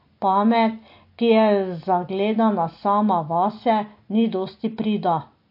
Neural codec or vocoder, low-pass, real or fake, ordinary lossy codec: none; 5.4 kHz; real; MP3, 32 kbps